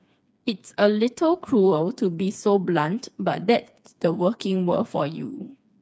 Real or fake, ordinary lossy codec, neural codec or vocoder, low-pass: fake; none; codec, 16 kHz, 4 kbps, FreqCodec, smaller model; none